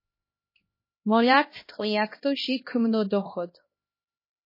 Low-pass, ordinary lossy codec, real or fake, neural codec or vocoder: 5.4 kHz; MP3, 24 kbps; fake; codec, 16 kHz, 1 kbps, X-Codec, HuBERT features, trained on LibriSpeech